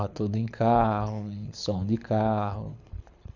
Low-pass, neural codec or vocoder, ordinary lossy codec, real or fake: 7.2 kHz; codec, 24 kHz, 6 kbps, HILCodec; none; fake